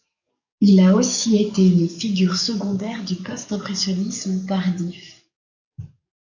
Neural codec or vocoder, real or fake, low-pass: codec, 44.1 kHz, 7.8 kbps, DAC; fake; 7.2 kHz